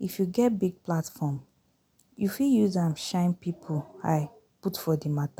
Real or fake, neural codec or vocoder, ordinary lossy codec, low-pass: real; none; none; none